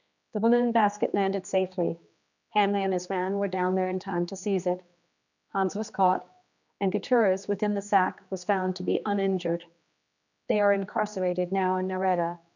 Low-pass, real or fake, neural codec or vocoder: 7.2 kHz; fake; codec, 16 kHz, 2 kbps, X-Codec, HuBERT features, trained on general audio